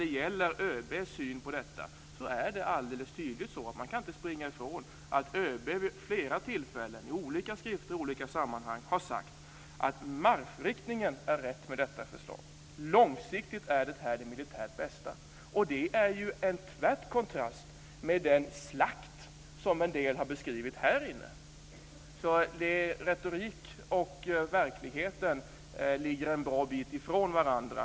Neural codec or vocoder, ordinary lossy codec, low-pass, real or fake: none; none; none; real